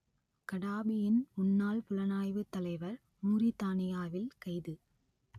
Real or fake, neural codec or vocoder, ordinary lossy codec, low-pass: real; none; none; 14.4 kHz